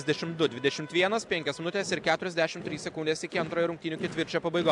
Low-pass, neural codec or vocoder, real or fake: 10.8 kHz; none; real